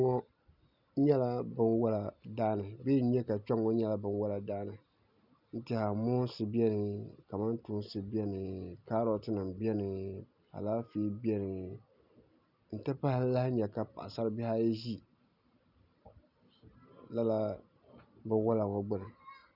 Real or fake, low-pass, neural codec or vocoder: real; 5.4 kHz; none